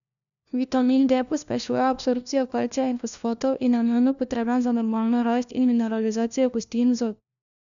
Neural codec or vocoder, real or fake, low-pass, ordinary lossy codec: codec, 16 kHz, 1 kbps, FunCodec, trained on LibriTTS, 50 frames a second; fake; 7.2 kHz; none